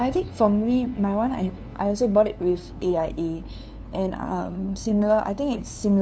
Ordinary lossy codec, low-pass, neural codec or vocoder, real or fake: none; none; codec, 16 kHz, 2 kbps, FunCodec, trained on LibriTTS, 25 frames a second; fake